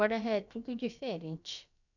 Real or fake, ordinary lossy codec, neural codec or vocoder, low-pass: fake; none; codec, 16 kHz, about 1 kbps, DyCAST, with the encoder's durations; 7.2 kHz